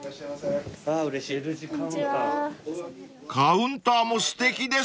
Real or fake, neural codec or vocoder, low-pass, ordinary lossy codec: real; none; none; none